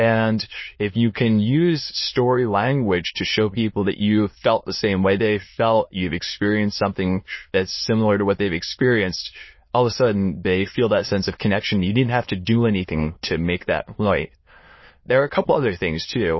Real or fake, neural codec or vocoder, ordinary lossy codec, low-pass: fake; autoencoder, 22.05 kHz, a latent of 192 numbers a frame, VITS, trained on many speakers; MP3, 24 kbps; 7.2 kHz